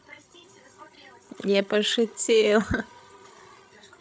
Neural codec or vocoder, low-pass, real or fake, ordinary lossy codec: codec, 16 kHz, 16 kbps, FreqCodec, larger model; none; fake; none